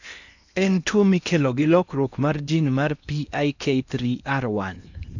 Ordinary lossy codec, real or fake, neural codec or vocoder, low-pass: none; fake; codec, 16 kHz in and 24 kHz out, 0.8 kbps, FocalCodec, streaming, 65536 codes; 7.2 kHz